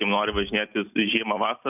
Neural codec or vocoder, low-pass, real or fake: none; 3.6 kHz; real